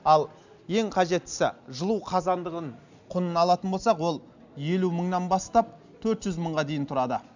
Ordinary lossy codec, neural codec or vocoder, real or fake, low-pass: none; none; real; 7.2 kHz